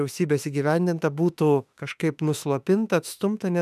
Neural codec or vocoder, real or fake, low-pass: autoencoder, 48 kHz, 32 numbers a frame, DAC-VAE, trained on Japanese speech; fake; 14.4 kHz